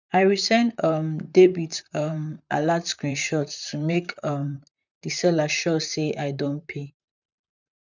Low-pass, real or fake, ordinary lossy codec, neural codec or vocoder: 7.2 kHz; fake; none; vocoder, 44.1 kHz, 128 mel bands, Pupu-Vocoder